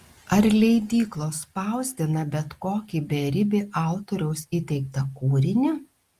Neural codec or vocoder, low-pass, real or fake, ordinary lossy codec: none; 14.4 kHz; real; Opus, 32 kbps